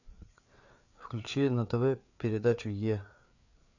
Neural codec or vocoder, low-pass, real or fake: codec, 16 kHz, 4 kbps, FreqCodec, larger model; 7.2 kHz; fake